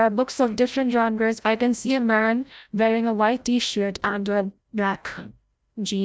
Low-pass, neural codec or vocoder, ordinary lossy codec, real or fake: none; codec, 16 kHz, 0.5 kbps, FreqCodec, larger model; none; fake